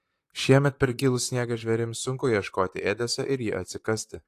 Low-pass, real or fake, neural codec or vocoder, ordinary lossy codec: 14.4 kHz; fake; vocoder, 44.1 kHz, 128 mel bands, Pupu-Vocoder; AAC, 64 kbps